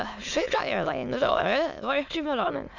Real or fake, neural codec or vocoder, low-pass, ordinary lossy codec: fake; autoencoder, 22.05 kHz, a latent of 192 numbers a frame, VITS, trained on many speakers; 7.2 kHz; none